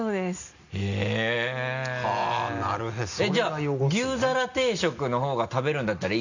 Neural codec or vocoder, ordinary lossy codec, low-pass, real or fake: none; none; 7.2 kHz; real